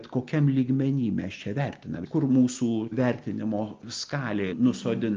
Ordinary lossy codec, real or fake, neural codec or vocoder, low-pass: Opus, 32 kbps; real; none; 7.2 kHz